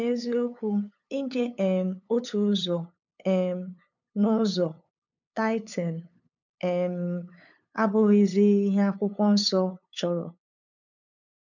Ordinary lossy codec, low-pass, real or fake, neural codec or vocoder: none; 7.2 kHz; fake; codec, 16 kHz, 8 kbps, FunCodec, trained on LibriTTS, 25 frames a second